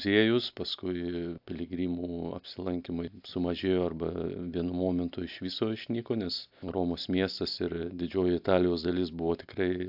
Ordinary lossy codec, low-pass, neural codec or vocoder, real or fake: AAC, 48 kbps; 5.4 kHz; none; real